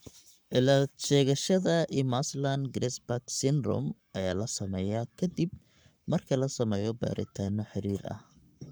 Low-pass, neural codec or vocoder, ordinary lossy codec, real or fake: none; codec, 44.1 kHz, 7.8 kbps, Pupu-Codec; none; fake